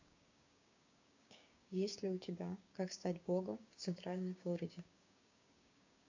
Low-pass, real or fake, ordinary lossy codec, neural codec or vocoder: 7.2 kHz; fake; AAC, 48 kbps; codec, 16 kHz, 6 kbps, DAC